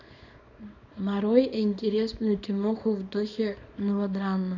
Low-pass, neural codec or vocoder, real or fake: 7.2 kHz; codec, 24 kHz, 0.9 kbps, WavTokenizer, small release; fake